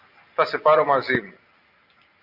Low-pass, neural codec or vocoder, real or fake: 5.4 kHz; none; real